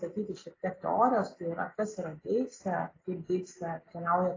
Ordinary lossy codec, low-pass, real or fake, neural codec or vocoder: AAC, 32 kbps; 7.2 kHz; real; none